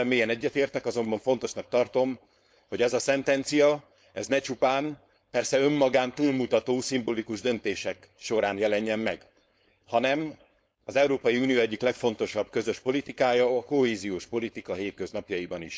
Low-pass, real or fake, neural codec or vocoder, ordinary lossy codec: none; fake; codec, 16 kHz, 4.8 kbps, FACodec; none